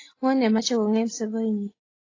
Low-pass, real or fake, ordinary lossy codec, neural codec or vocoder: 7.2 kHz; real; AAC, 32 kbps; none